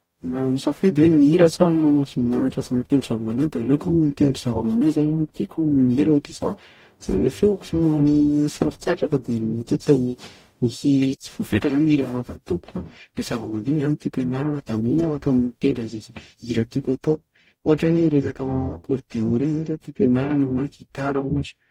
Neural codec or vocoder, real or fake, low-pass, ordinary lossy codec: codec, 44.1 kHz, 0.9 kbps, DAC; fake; 19.8 kHz; AAC, 48 kbps